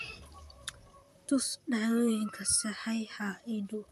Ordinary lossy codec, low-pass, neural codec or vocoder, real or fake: none; 14.4 kHz; vocoder, 44.1 kHz, 128 mel bands, Pupu-Vocoder; fake